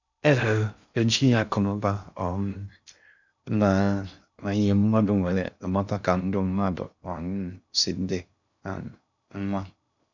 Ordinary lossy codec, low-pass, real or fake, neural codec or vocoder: none; 7.2 kHz; fake; codec, 16 kHz in and 24 kHz out, 0.6 kbps, FocalCodec, streaming, 2048 codes